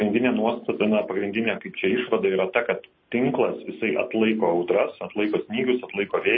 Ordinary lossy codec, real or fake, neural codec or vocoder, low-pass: MP3, 24 kbps; real; none; 7.2 kHz